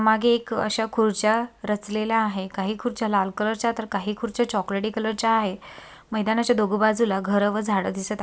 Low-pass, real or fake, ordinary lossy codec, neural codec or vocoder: none; real; none; none